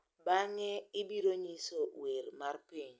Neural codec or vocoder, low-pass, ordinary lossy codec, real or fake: none; none; none; real